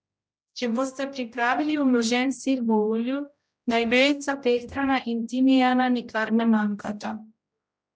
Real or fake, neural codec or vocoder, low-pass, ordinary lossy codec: fake; codec, 16 kHz, 0.5 kbps, X-Codec, HuBERT features, trained on general audio; none; none